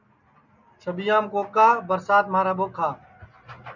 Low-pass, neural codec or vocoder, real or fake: 7.2 kHz; none; real